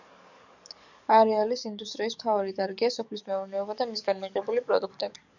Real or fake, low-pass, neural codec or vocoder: fake; 7.2 kHz; codec, 44.1 kHz, 7.8 kbps, DAC